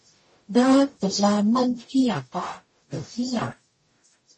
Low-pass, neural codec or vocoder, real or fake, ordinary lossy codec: 9.9 kHz; codec, 44.1 kHz, 0.9 kbps, DAC; fake; MP3, 32 kbps